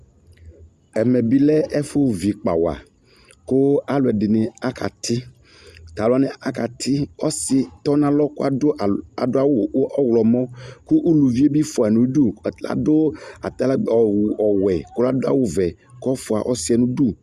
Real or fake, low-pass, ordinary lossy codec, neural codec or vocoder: real; 14.4 kHz; Opus, 64 kbps; none